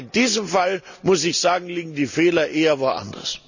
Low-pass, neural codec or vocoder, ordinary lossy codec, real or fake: 7.2 kHz; none; none; real